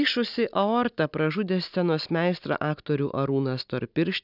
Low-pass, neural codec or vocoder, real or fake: 5.4 kHz; none; real